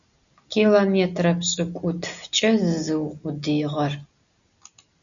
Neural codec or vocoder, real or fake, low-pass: none; real; 7.2 kHz